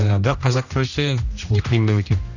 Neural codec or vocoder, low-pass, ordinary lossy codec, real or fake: codec, 16 kHz, 1 kbps, X-Codec, HuBERT features, trained on general audio; 7.2 kHz; none; fake